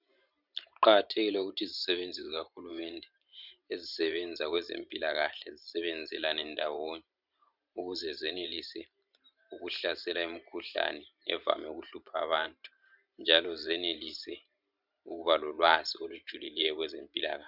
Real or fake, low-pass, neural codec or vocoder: fake; 5.4 kHz; vocoder, 44.1 kHz, 128 mel bands every 512 samples, BigVGAN v2